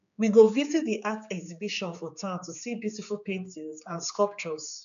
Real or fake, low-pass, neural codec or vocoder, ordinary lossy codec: fake; 7.2 kHz; codec, 16 kHz, 4 kbps, X-Codec, HuBERT features, trained on general audio; none